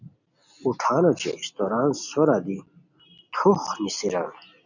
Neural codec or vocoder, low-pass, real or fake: none; 7.2 kHz; real